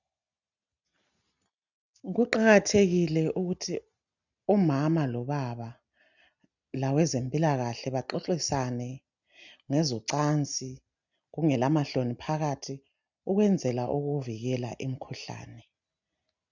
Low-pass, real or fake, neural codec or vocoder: 7.2 kHz; real; none